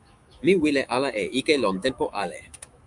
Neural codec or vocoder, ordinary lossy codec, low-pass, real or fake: autoencoder, 48 kHz, 128 numbers a frame, DAC-VAE, trained on Japanese speech; Opus, 64 kbps; 10.8 kHz; fake